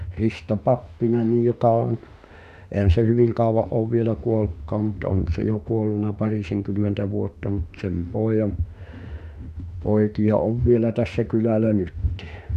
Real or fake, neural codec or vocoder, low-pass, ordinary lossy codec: fake; autoencoder, 48 kHz, 32 numbers a frame, DAC-VAE, trained on Japanese speech; 14.4 kHz; none